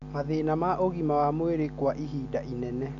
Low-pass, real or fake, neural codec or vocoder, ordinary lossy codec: 7.2 kHz; real; none; none